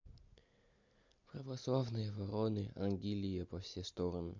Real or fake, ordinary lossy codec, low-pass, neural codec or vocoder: real; MP3, 64 kbps; 7.2 kHz; none